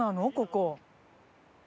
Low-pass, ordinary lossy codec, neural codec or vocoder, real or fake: none; none; none; real